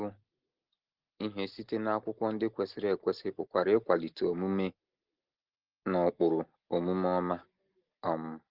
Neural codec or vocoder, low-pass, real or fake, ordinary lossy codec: none; 5.4 kHz; real; Opus, 16 kbps